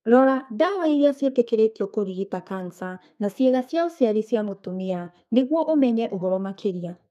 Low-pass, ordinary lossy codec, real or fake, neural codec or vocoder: 14.4 kHz; none; fake; codec, 32 kHz, 1.9 kbps, SNAC